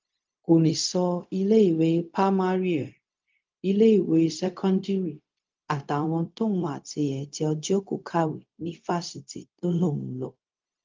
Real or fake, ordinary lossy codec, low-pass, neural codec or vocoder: fake; Opus, 24 kbps; 7.2 kHz; codec, 16 kHz, 0.4 kbps, LongCat-Audio-Codec